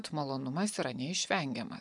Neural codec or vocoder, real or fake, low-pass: none; real; 10.8 kHz